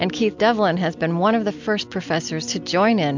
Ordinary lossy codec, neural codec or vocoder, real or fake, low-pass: MP3, 64 kbps; none; real; 7.2 kHz